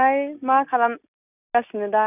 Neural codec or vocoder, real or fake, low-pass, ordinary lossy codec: none; real; 3.6 kHz; none